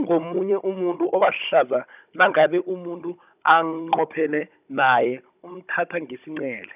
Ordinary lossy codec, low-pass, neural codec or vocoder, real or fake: none; 3.6 kHz; codec, 16 kHz, 16 kbps, FunCodec, trained on Chinese and English, 50 frames a second; fake